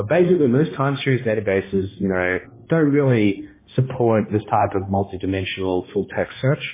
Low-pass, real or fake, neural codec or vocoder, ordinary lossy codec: 3.6 kHz; fake; codec, 16 kHz, 1 kbps, X-Codec, HuBERT features, trained on balanced general audio; MP3, 16 kbps